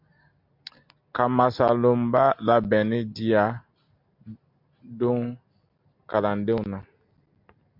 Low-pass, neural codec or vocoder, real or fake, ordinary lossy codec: 5.4 kHz; none; real; MP3, 48 kbps